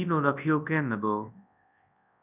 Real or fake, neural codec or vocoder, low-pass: fake; codec, 24 kHz, 0.9 kbps, WavTokenizer, large speech release; 3.6 kHz